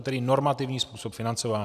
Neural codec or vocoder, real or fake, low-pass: none; real; 14.4 kHz